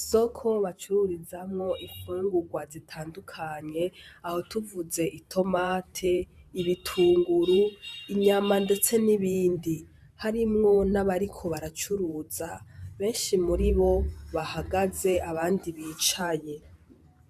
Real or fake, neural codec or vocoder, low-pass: fake; vocoder, 44.1 kHz, 128 mel bands every 512 samples, BigVGAN v2; 14.4 kHz